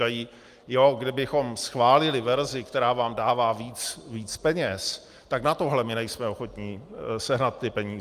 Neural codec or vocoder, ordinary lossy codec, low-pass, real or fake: none; Opus, 32 kbps; 14.4 kHz; real